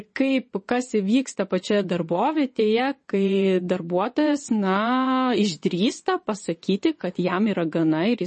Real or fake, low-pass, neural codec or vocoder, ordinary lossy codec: fake; 9.9 kHz; vocoder, 22.05 kHz, 80 mel bands, WaveNeXt; MP3, 32 kbps